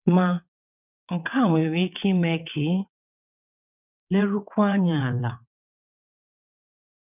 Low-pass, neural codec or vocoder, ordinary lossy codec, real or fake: 3.6 kHz; vocoder, 22.05 kHz, 80 mel bands, WaveNeXt; none; fake